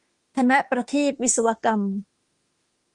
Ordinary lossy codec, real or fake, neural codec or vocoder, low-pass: Opus, 24 kbps; fake; autoencoder, 48 kHz, 32 numbers a frame, DAC-VAE, trained on Japanese speech; 10.8 kHz